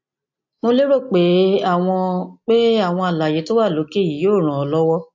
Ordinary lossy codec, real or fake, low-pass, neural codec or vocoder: MP3, 48 kbps; real; 7.2 kHz; none